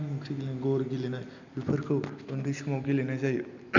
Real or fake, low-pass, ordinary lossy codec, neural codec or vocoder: real; 7.2 kHz; none; none